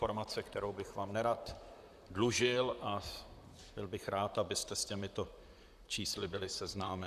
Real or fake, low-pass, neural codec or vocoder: fake; 14.4 kHz; vocoder, 44.1 kHz, 128 mel bands, Pupu-Vocoder